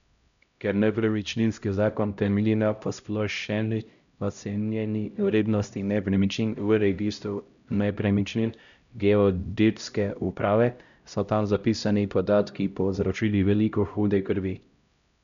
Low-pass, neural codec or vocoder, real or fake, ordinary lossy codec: 7.2 kHz; codec, 16 kHz, 0.5 kbps, X-Codec, HuBERT features, trained on LibriSpeech; fake; none